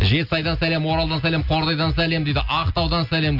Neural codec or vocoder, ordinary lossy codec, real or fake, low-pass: none; none; real; 5.4 kHz